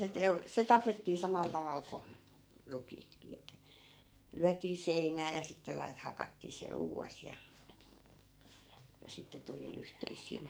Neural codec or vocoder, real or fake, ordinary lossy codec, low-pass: codec, 44.1 kHz, 2.6 kbps, SNAC; fake; none; none